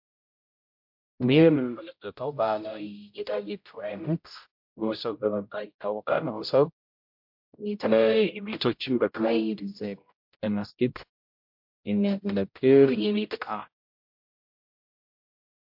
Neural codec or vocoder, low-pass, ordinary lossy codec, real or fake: codec, 16 kHz, 0.5 kbps, X-Codec, HuBERT features, trained on general audio; 5.4 kHz; MP3, 48 kbps; fake